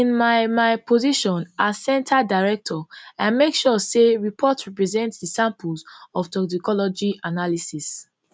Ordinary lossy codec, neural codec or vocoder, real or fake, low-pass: none; none; real; none